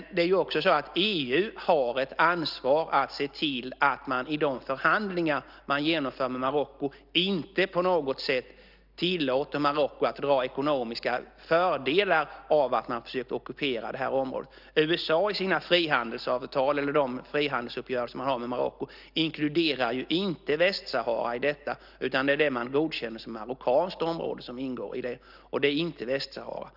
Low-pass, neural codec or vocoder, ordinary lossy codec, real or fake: 5.4 kHz; none; none; real